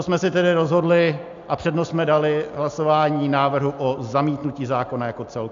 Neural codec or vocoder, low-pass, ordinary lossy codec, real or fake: none; 7.2 kHz; AAC, 64 kbps; real